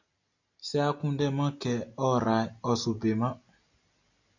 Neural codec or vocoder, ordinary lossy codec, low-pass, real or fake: none; AAC, 48 kbps; 7.2 kHz; real